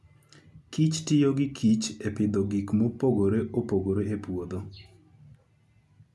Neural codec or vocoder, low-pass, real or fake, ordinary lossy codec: none; none; real; none